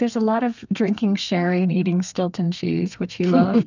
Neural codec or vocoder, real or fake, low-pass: codec, 32 kHz, 1.9 kbps, SNAC; fake; 7.2 kHz